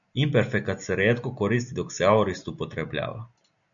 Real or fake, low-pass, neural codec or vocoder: real; 7.2 kHz; none